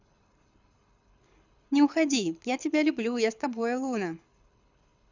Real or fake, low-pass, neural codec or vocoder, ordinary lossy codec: fake; 7.2 kHz; codec, 24 kHz, 6 kbps, HILCodec; none